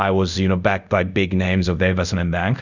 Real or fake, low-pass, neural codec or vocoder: fake; 7.2 kHz; codec, 24 kHz, 0.5 kbps, DualCodec